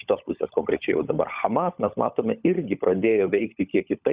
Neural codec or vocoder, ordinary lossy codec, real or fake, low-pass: codec, 16 kHz, 16 kbps, FunCodec, trained on LibriTTS, 50 frames a second; Opus, 64 kbps; fake; 3.6 kHz